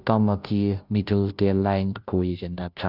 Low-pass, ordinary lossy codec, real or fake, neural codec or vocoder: 5.4 kHz; none; fake; codec, 16 kHz, 0.5 kbps, FunCodec, trained on Chinese and English, 25 frames a second